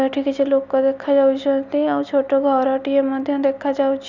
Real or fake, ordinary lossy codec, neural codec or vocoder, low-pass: real; none; none; 7.2 kHz